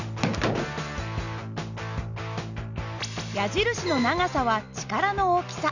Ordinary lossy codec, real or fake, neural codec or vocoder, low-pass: none; real; none; 7.2 kHz